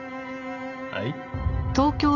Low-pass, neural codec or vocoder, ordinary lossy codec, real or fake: 7.2 kHz; none; none; real